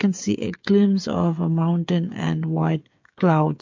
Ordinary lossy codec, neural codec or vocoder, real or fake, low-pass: MP3, 48 kbps; codec, 16 kHz, 8 kbps, FreqCodec, smaller model; fake; 7.2 kHz